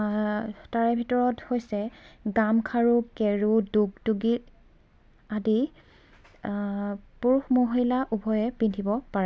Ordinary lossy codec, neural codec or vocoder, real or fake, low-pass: none; none; real; none